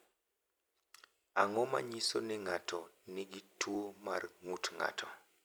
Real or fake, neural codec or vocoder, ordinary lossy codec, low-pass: fake; vocoder, 44.1 kHz, 128 mel bands every 256 samples, BigVGAN v2; none; none